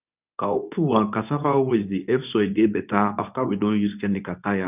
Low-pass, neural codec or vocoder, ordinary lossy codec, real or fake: 3.6 kHz; codec, 24 kHz, 0.9 kbps, WavTokenizer, medium speech release version 2; none; fake